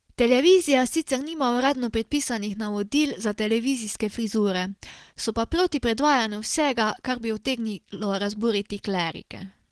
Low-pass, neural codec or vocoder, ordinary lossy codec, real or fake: 10.8 kHz; none; Opus, 16 kbps; real